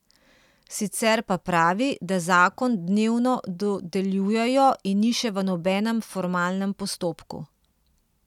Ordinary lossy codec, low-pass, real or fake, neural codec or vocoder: none; 19.8 kHz; real; none